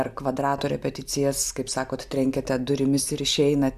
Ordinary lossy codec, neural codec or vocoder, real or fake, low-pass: AAC, 96 kbps; none; real; 14.4 kHz